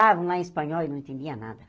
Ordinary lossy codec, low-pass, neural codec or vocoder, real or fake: none; none; none; real